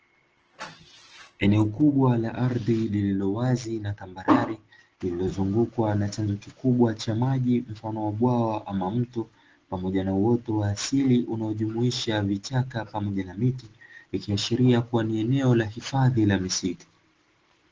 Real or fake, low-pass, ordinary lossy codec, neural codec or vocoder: real; 7.2 kHz; Opus, 16 kbps; none